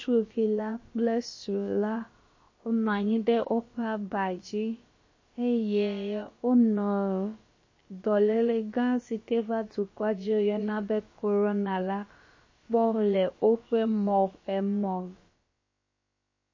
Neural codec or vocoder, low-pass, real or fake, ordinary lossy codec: codec, 16 kHz, about 1 kbps, DyCAST, with the encoder's durations; 7.2 kHz; fake; MP3, 32 kbps